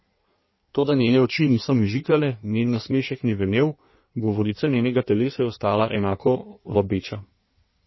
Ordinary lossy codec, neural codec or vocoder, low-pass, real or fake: MP3, 24 kbps; codec, 16 kHz in and 24 kHz out, 1.1 kbps, FireRedTTS-2 codec; 7.2 kHz; fake